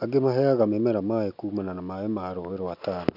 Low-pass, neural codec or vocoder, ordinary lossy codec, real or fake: 5.4 kHz; none; none; real